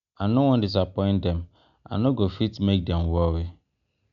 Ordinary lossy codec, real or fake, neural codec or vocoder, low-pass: none; real; none; 7.2 kHz